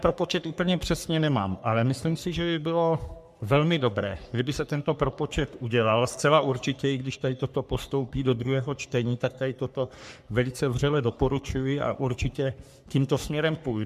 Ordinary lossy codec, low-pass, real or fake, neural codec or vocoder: MP3, 96 kbps; 14.4 kHz; fake; codec, 44.1 kHz, 3.4 kbps, Pupu-Codec